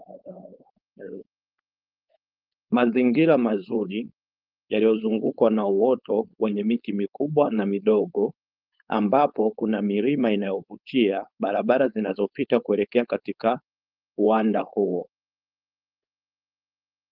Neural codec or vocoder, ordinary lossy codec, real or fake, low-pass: codec, 16 kHz, 4.8 kbps, FACodec; Opus, 32 kbps; fake; 5.4 kHz